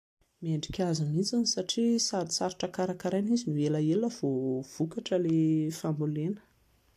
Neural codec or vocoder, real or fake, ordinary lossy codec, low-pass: none; real; AAC, 64 kbps; 14.4 kHz